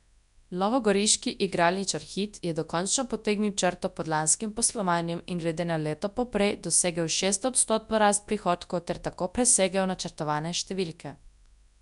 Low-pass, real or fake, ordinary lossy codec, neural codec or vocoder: 10.8 kHz; fake; none; codec, 24 kHz, 0.9 kbps, WavTokenizer, large speech release